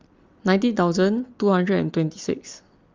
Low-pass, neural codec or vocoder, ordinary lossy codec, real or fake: 7.2 kHz; none; Opus, 32 kbps; real